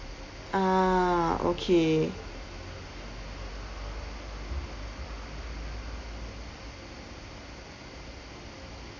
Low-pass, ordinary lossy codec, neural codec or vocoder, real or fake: 7.2 kHz; MP3, 48 kbps; none; real